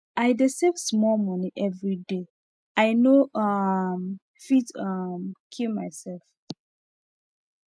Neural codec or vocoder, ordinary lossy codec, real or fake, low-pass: none; none; real; none